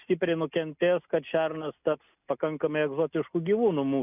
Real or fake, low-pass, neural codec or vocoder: real; 3.6 kHz; none